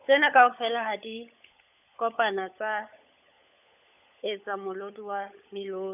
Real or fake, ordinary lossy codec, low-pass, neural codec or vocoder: fake; none; 3.6 kHz; codec, 16 kHz, 16 kbps, FunCodec, trained on LibriTTS, 50 frames a second